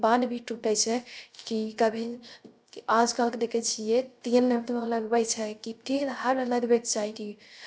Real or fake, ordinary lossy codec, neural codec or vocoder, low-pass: fake; none; codec, 16 kHz, 0.3 kbps, FocalCodec; none